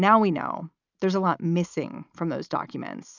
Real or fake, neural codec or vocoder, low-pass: real; none; 7.2 kHz